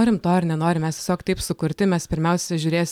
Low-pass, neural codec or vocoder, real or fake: 19.8 kHz; none; real